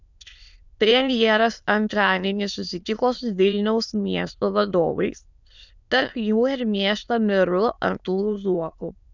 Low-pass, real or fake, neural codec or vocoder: 7.2 kHz; fake; autoencoder, 22.05 kHz, a latent of 192 numbers a frame, VITS, trained on many speakers